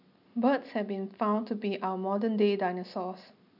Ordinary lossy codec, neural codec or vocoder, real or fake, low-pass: none; none; real; 5.4 kHz